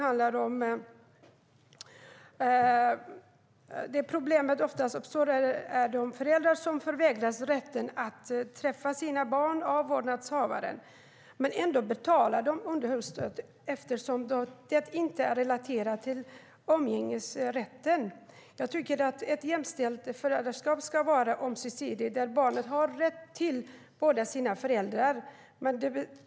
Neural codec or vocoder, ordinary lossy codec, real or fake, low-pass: none; none; real; none